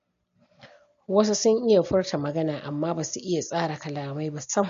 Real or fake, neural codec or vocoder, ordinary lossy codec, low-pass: real; none; MP3, 48 kbps; 7.2 kHz